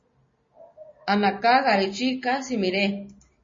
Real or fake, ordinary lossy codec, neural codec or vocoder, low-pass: real; MP3, 32 kbps; none; 7.2 kHz